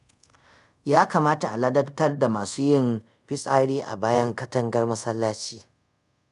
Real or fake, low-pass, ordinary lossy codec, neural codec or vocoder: fake; 10.8 kHz; none; codec, 24 kHz, 0.5 kbps, DualCodec